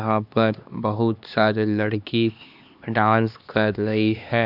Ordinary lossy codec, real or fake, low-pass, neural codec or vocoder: none; fake; 5.4 kHz; codec, 16 kHz, 2 kbps, X-Codec, HuBERT features, trained on LibriSpeech